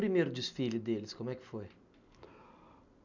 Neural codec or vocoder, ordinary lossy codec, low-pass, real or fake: none; none; 7.2 kHz; real